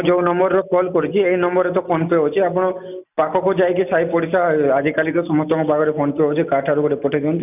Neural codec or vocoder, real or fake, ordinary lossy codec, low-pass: none; real; none; 3.6 kHz